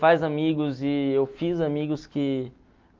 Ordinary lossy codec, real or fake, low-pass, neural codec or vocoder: Opus, 32 kbps; real; 7.2 kHz; none